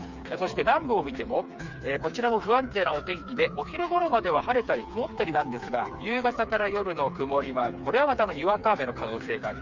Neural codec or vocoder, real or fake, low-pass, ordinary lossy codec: codec, 16 kHz, 4 kbps, FreqCodec, smaller model; fake; 7.2 kHz; none